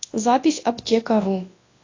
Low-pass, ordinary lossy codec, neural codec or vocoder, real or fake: 7.2 kHz; AAC, 32 kbps; codec, 24 kHz, 0.9 kbps, WavTokenizer, large speech release; fake